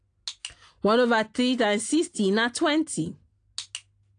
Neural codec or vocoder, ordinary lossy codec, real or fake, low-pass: none; AAC, 48 kbps; real; 9.9 kHz